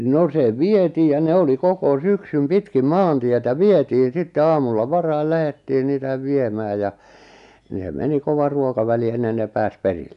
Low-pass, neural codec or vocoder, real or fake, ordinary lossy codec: 10.8 kHz; none; real; none